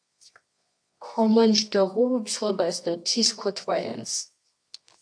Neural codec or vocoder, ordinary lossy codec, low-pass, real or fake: codec, 24 kHz, 0.9 kbps, WavTokenizer, medium music audio release; AAC, 64 kbps; 9.9 kHz; fake